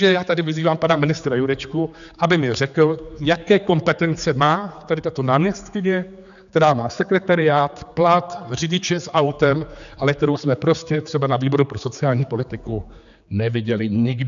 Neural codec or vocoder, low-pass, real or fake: codec, 16 kHz, 4 kbps, X-Codec, HuBERT features, trained on general audio; 7.2 kHz; fake